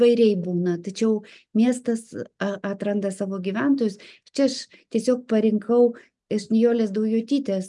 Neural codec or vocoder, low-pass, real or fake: none; 10.8 kHz; real